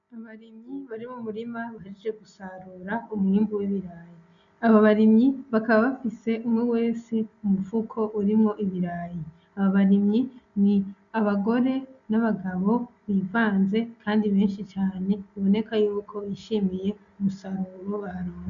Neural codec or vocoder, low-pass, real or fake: none; 7.2 kHz; real